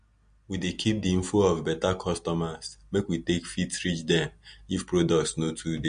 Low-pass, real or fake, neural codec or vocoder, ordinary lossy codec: 9.9 kHz; real; none; MP3, 48 kbps